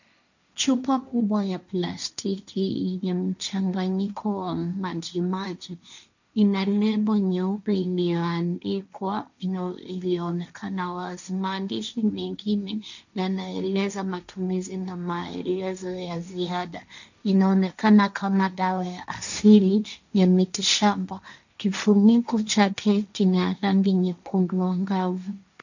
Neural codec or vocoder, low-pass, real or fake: codec, 16 kHz, 1.1 kbps, Voila-Tokenizer; 7.2 kHz; fake